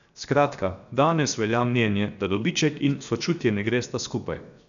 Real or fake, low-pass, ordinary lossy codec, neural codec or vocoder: fake; 7.2 kHz; none; codec, 16 kHz, 0.7 kbps, FocalCodec